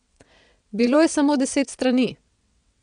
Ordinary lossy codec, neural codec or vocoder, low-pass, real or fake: none; vocoder, 22.05 kHz, 80 mel bands, WaveNeXt; 9.9 kHz; fake